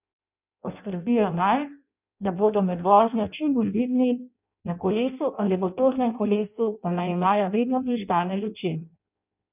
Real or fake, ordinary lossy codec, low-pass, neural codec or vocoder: fake; none; 3.6 kHz; codec, 16 kHz in and 24 kHz out, 0.6 kbps, FireRedTTS-2 codec